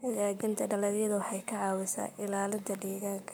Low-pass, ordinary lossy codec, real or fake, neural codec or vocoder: none; none; fake; codec, 44.1 kHz, 7.8 kbps, Pupu-Codec